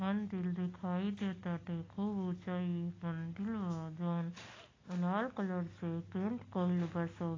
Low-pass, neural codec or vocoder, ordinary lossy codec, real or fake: 7.2 kHz; none; AAC, 32 kbps; real